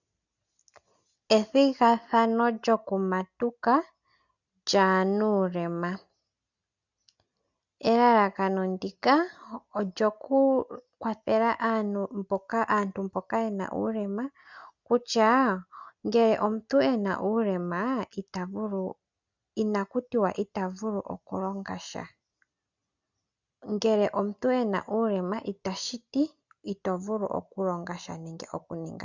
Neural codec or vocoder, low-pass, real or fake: none; 7.2 kHz; real